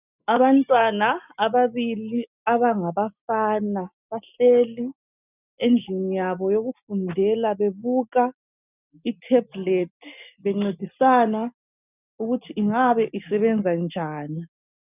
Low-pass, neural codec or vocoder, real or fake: 3.6 kHz; none; real